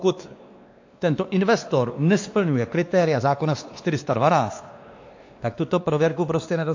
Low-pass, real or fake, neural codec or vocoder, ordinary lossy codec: 7.2 kHz; fake; codec, 16 kHz, 2 kbps, X-Codec, WavLM features, trained on Multilingual LibriSpeech; AAC, 48 kbps